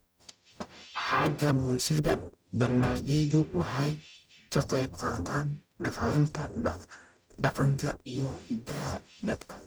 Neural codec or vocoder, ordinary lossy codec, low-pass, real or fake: codec, 44.1 kHz, 0.9 kbps, DAC; none; none; fake